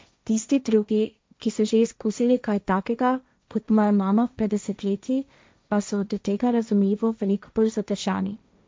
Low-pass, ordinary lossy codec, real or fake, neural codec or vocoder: none; none; fake; codec, 16 kHz, 1.1 kbps, Voila-Tokenizer